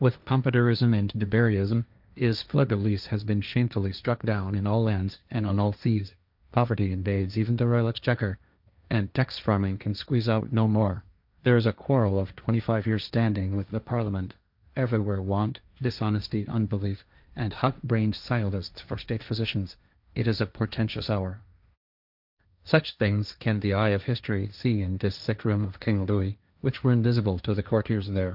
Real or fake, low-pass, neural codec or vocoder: fake; 5.4 kHz; codec, 16 kHz, 1.1 kbps, Voila-Tokenizer